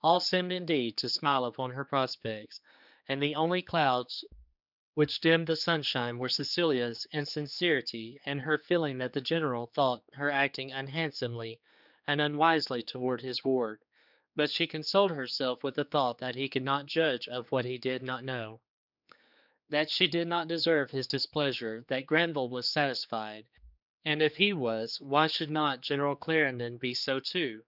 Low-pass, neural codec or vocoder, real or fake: 5.4 kHz; codec, 16 kHz, 4 kbps, X-Codec, HuBERT features, trained on general audio; fake